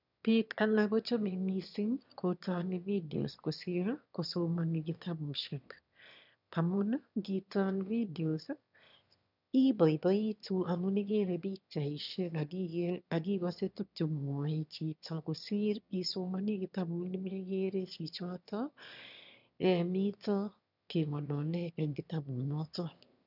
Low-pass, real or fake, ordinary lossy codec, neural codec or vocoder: 5.4 kHz; fake; none; autoencoder, 22.05 kHz, a latent of 192 numbers a frame, VITS, trained on one speaker